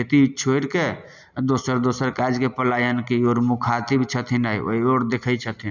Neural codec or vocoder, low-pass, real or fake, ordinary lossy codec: none; 7.2 kHz; real; none